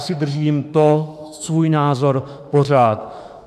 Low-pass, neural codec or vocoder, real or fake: 14.4 kHz; autoencoder, 48 kHz, 32 numbers a frame, DAC-VAE, trained on Japanese speech; fake